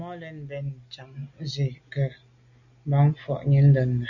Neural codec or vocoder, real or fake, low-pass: none; real; 7.2 kHz